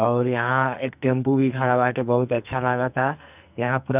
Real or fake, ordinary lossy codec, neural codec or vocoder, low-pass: fake; none; codec, 44.1 kHz, 2.6 kbps, SNAC; 3.6 kHz